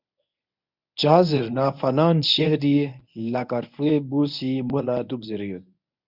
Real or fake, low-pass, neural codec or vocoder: fake; 5.4 kHz; codec, 24 kHz, 0.9 kbps, WavTokenizer, medium speech release version 1